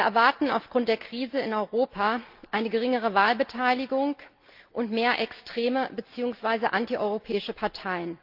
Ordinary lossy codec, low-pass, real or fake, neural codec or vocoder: Opus, 24 kbps; 5.4 kHz; real; none